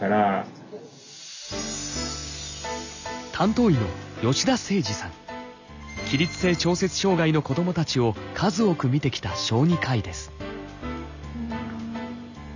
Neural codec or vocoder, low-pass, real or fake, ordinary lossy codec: none; 7.2 kHz; real; none